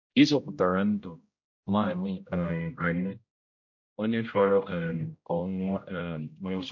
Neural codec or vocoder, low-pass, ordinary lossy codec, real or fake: codec, 16 kHz, 0.5 kbps, X-Codec, HuBERT features, trained on general audio; 7.2 kHz; MP3, 48 kbps; fake